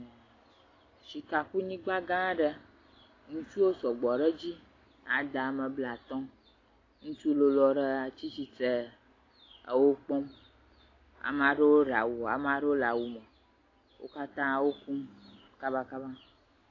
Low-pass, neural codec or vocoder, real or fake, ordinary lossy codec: 7.2 kHz; none; real; AAC, 32 kbps